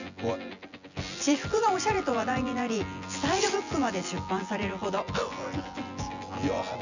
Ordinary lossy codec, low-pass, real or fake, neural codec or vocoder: none; 7.2 kHz; fake; vocoder, 24 kHz, 100 mel bands, Vocos